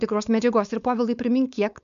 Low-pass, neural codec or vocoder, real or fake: 7.2 kHz; codec, 16 kHz, 4.8 kbps, FACodec; fake